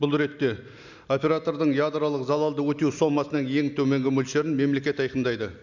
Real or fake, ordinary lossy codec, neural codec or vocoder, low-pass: real; none; none; 7.2 kHz